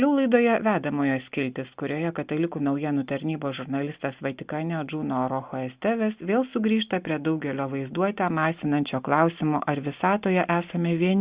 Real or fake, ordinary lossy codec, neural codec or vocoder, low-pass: real; Opus, 64 kbps; none; 3.6 kHz